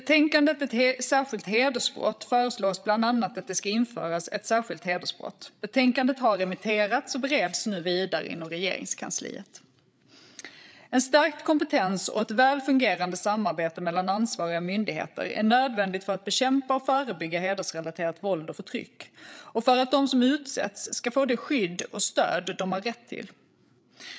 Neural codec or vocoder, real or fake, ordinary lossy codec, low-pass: codec, 16 kHz, 8 kbps, FreqCodec, larger model; fake; none; none